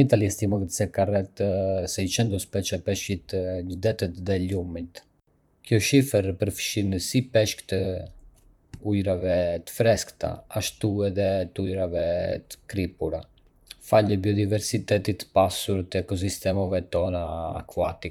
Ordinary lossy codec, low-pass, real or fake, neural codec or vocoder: none; 19.8 kHz; fake; vocoder, 44.1 kHz, 128 mel bands, Pupu-Vocoder